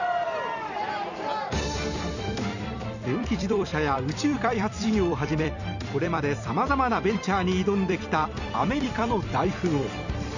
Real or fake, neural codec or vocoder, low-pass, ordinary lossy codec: fake; vocoder, 44.1 kHz, 128 mel bands every 256 samples, BigVGAN v2; 7.2 kHz; none